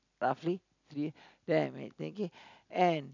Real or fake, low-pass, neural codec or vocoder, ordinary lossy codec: real; 7.2 kHz; none; none